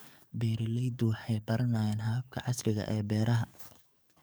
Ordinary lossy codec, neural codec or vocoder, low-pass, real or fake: none; codec, 44.1 kHz, 7.8 kbps, DAC; none; fake